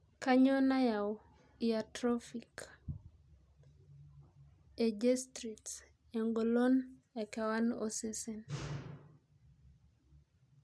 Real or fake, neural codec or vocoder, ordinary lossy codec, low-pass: real; none; none; none